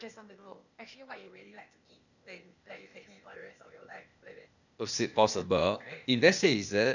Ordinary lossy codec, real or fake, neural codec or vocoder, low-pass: none; fake; codec, 16 kHz, 0.8 kbps, ZipCodec; 7.2 kHz